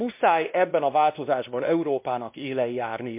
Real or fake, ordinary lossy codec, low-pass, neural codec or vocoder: fake; MP3, 32 kbps; 3.6 kHz; codec, 16 kHz, 1 kbps, X-Codec, WavLM features, trained on Multilingual LibriSpeech